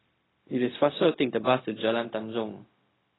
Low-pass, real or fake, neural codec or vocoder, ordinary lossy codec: 7.2 kHz; fake; codec, 16 kHz, 0.4 kbps, LongCat-Audio-Codec; AAC, 16 kbps